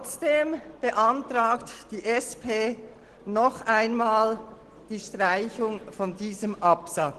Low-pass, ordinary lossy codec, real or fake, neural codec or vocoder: 10.8 kHz; Opus, 16 kbps; real; none